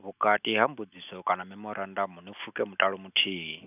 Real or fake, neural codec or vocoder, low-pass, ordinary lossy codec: real; none; 3.6 kHz; none